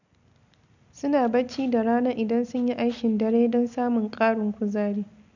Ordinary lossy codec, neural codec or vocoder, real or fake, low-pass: none; none; real; 7.2 kHz